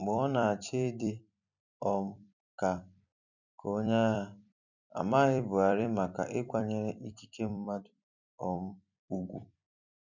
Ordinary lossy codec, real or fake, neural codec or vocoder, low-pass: none; fake; vocoder, 44.1 kHz, 128 mel bands every 256 samples, BigVGAN v2; 7.2 kHz